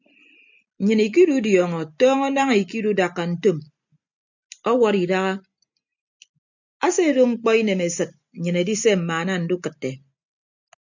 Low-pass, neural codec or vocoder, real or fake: 7.2 kHz; none; real